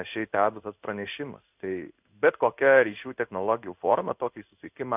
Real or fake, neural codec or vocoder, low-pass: fake; codec, 16 kHz in and 24 kHz out, 1 kbps, XY-Tokenizer; 3.6 kHz